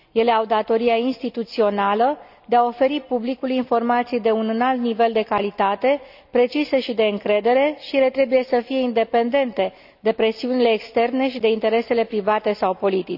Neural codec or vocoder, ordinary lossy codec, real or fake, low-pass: none; none; real; 5.4 kHz